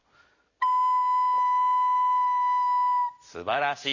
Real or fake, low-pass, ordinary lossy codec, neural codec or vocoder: real; 7.2 kHz; Opus, 64 kbps; none